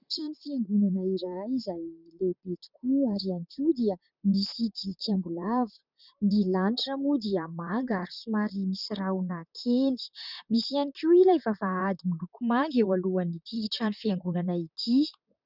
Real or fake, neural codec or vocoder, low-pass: fake; vocoder, 44.1 kHz, 128 mel bands, Pupu-Vocoder; 5.4 kHz